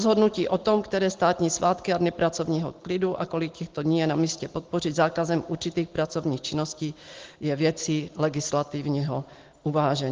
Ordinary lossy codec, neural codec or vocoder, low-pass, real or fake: Opus, 16 kbps; none; 7.2 kHz; real